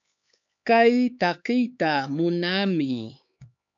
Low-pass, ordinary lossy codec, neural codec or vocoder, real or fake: 7.2 kHz; MP3, 64 kbps; codec, 16 kHz, 4 kbps, X-Codec, HuBERT features, trained on LibriSpeech; fake